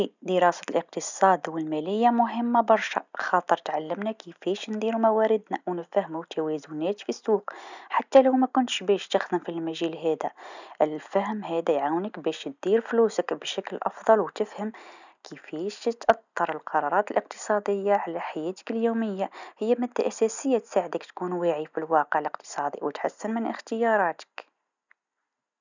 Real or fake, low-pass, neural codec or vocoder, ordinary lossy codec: real; 7.2 kHz; none; none